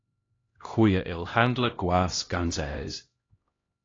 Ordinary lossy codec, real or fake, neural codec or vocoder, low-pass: AAC, 32 kbps; fake; codec, 16 kHz, 1 kbps, X-Codec, HuBERT features, trained on LibriSpeech; 7.2 kHz